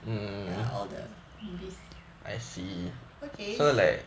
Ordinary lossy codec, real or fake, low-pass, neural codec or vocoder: none; real; none; none